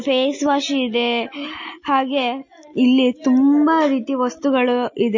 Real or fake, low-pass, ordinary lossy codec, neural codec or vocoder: real; 7.2 kHz; MP3, 32 kbps; none